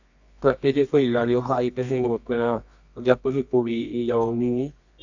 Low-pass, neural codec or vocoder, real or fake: 7.2 kHz; codec, 24 kHz, 0.9 kbps, WavTokenizer, medium music audio release; fake